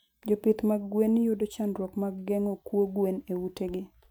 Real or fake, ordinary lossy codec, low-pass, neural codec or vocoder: real; none; 19.8 kHz; none